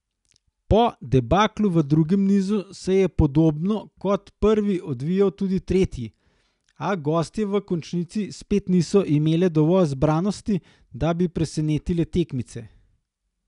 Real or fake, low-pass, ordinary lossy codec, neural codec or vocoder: real; 10.8 kHz; none; none